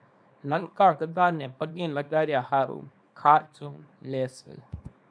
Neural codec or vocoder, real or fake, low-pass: codec, 24 kHz, 0.9 kbps, WavTokenizer, small release; fake; 9.9 kHz